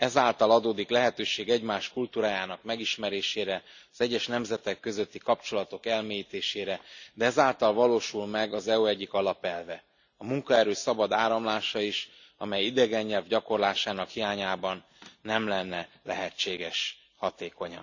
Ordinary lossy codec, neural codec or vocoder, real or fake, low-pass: none; none; real; 7.2 kHz